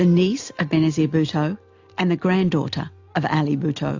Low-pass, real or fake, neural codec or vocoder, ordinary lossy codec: 7.2 kHz; real; none; AAC, 48 kbps